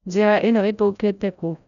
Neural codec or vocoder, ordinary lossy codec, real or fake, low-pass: codec, 16 kHz, 0.5 kbps, FreqCodec, larger model; none; fake; 7.2 kHz